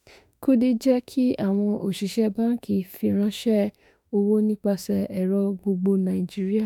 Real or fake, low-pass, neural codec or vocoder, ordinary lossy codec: fake; 19.8 kHz; autoencoder, 48 kHz, 32 numbers a frame, DAC-VAE, trained on Japanese speech; none